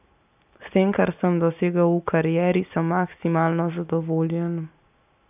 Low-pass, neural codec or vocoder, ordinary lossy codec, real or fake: 3.6 kHz; none; AAC, 32 kbps; real